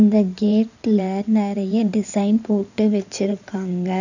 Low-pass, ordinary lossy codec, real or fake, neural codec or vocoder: 7.2 kHz; none; fake; codec, 16 kHz in and 24 kHz out, 2.2 kbps, FireRedTTS-2 codec